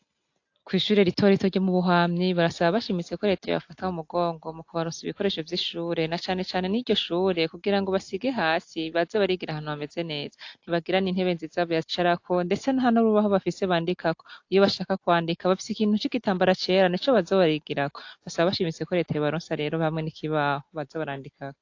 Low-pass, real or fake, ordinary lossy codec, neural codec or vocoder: 7.2 kHz; real; AAC, 48 kbps; none